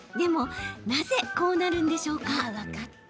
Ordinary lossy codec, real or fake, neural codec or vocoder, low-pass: none; real; none; none